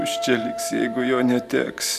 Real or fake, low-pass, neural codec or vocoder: fake; 14.4 kHz; vocoder, 44.1 kHz, 128 mel bands every 512 samples, BigVGAN v2